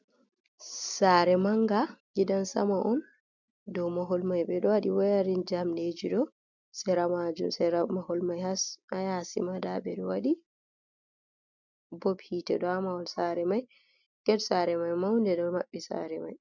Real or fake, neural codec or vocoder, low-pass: real; none; 7.2 kHz